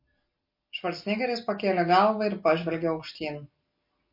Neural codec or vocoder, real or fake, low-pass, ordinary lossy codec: none; real; 5.4 kHz; MP3, 32 kbps